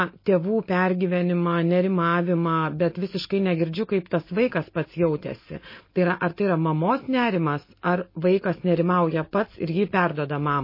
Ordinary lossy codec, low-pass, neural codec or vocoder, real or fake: MP3, 24 kbps; 5.4 kHz; none; real